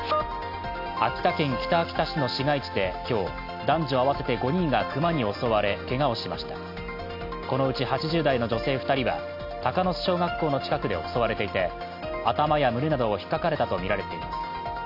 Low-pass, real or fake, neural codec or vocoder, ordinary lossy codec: 5.4 kHz; real; none; none